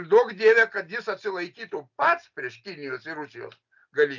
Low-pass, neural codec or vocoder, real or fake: 7.2 kHz; none; real